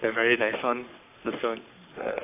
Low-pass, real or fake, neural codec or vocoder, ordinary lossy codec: 3.6 kHz; fake; codec, 44.1 kHz, 3.4 kbps, Pupu-Codec; AAC, 24 kbps